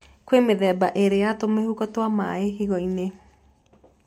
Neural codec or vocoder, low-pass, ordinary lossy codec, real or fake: none; 19.8 kHz; MP3, 64 kbps; real